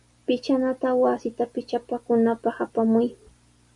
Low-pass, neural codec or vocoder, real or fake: 10.8 kHz; none; real